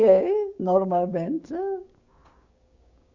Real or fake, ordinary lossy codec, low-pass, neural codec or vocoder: fake; Opus, 64 kbps; 7.2 kHz; codec, 16 kHz, 6 kbps, DAC